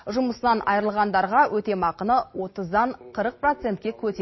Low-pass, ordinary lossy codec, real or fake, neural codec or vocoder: 7.2 kHz; MP3, 24 kbps; real; none